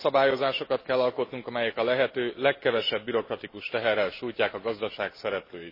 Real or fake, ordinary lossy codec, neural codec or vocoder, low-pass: real; MP3, 24 kbps; none; 5.4 kHz